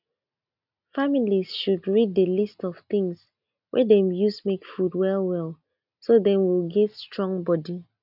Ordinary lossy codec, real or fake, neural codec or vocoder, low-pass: none; real; none; 5.4 kHz